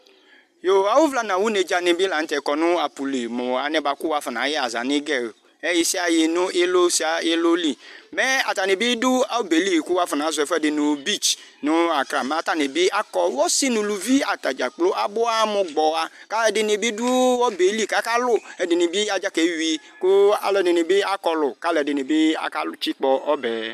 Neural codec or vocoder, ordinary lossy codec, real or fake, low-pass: none; MP3, 96 kbps; real; 14.4 kHz